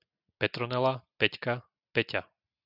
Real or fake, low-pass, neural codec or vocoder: real; 5.4 kHz; none